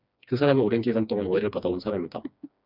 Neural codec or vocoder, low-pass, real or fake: codec, 16 kHz, 2 kbps, FreqCodec, smaller model; 5.4 kHz; fake